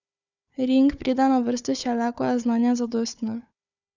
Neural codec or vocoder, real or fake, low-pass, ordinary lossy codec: codec, 16 kHz, 4 kbps, FunCodec, trained on Chinese and English, 50 frames a second; fake; 7.2 kHz; none